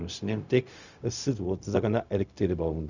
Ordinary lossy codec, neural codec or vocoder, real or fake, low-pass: none; codec, 16 kHz, 0.4 kbps, LongCat-Audio-Codec; fake; 7.2 kHz